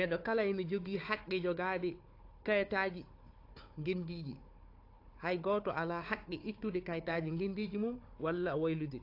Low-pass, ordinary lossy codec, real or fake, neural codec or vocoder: 5.4 kHz; AAC, 32 kbps; fake; codec, 16 kHz, 4 kbps, FunCodec, trained on Chinese and English, 50 frames a second